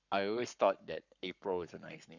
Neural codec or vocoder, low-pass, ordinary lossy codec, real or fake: codec, 44.1 kHz, 7.8 kbps, Pupu-Codec; 7.2 kHz; none; fake